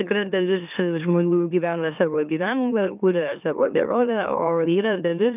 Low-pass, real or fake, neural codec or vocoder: 3.6 kHz; fake; autoencoder, 44.1 kHz, a latent of 192 numbers a frame, MeloTTS